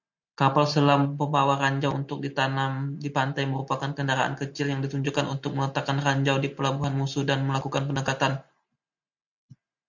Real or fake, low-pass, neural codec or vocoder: real; 7.2 kHz; none